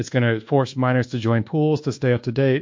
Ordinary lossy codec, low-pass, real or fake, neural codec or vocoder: MP3, 48 kbps; 7.2 kHz; fake; codec, 24 kHz, 1.2 kbps, DualCodec